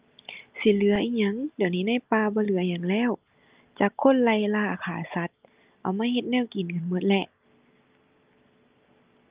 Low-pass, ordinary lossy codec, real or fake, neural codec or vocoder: 3.6 kHz; Opus, 24 kbps; real; none